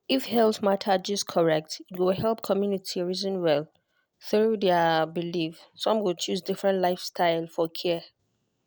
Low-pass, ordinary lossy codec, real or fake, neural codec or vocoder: none; none; real; none